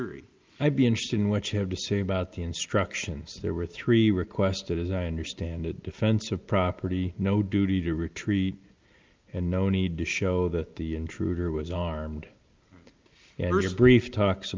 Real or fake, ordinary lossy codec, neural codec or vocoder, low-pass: real; Opus, 24 kbps; none; 7.2 kHz